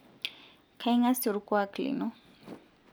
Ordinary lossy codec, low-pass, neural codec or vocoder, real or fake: none; none; none; real